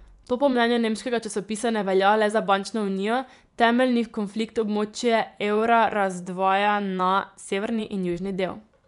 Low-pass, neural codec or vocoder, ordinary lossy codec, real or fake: 10.8 kHz; vocoder, 24 kHz, 100 mel bands, Vocos; none; fake